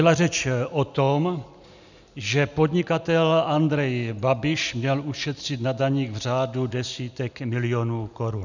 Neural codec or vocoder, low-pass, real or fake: none; 7.2 kHz; real